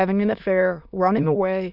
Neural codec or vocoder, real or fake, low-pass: autoencoder, 22.05 kHz, a latent of 192 numbers a frame, VITS, trained on many speakers; fake; 5.4 kHz